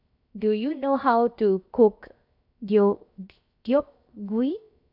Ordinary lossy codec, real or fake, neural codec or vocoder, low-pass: none; fake; codec, 16 kHz, 0.7 kbps, FocalCodec; 5.4 kHz